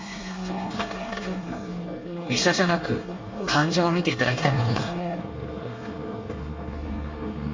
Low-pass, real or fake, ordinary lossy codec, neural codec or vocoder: 7.2 kHz; fake; MP3, 48 kbps; codec, 24 kHz, 1 kbps, SNAC